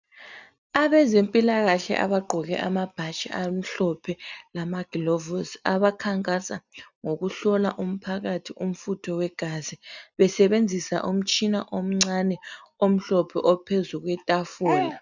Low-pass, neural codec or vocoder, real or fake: 7.2 kHz; none; real